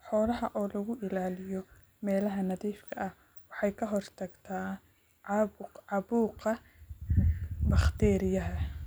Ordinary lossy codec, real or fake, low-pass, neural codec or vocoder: none; real; none; none